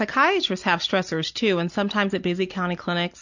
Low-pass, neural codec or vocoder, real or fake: 7.2 kHz; none; real